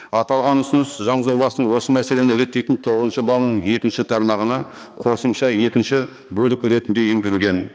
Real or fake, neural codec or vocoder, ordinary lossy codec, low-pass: fake; codec, 16 kHz, 2 kbps, X-Codec, HuBERT features, trained on balanced general audio; none; none